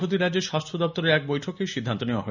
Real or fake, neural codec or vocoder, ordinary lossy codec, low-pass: real; none; none; 7.2 kHz